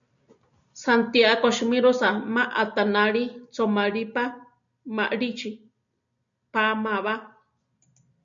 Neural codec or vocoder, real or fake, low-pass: none; real; 7.2 kHz